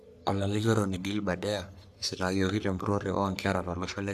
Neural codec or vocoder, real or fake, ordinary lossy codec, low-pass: codec, 44.1 kHz, 3.4 kbps, Pupu-Codec; fake; none; 14.4 kHz